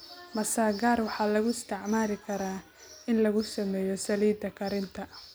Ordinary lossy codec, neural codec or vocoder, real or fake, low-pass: none; none; real; none